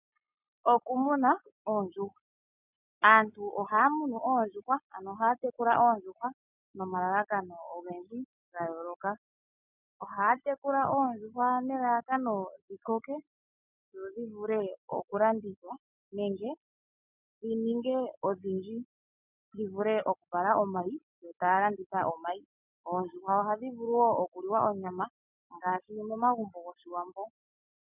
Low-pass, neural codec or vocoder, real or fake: 3.6 kHz; none; real